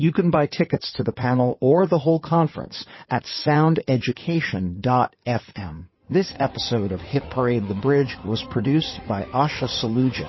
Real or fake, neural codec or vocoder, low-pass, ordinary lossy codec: fake; codec, 16 kHz in and 24 kHz out, 2.2 kbps, FireRedTTS-2 codec; 7.2 kHz; MP3, 24 kbps